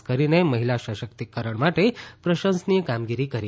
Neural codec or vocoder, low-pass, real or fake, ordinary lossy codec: none; none; real; none